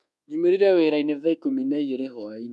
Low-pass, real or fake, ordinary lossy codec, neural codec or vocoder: none; fake; none; codec, 24 kHz, 1.2 kbps, DualCodec